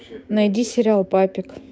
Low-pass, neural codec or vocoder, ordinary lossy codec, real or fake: none; codec, 16 kHz, 6 kbps, DAC; none; fake